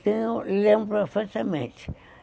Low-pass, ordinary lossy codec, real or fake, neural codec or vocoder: none; none; real; none